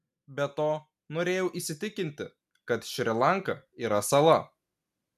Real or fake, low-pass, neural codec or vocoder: real; 14.4 kHz; none